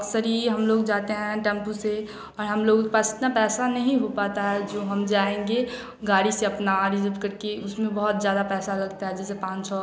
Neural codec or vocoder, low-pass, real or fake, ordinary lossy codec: none; none; real; none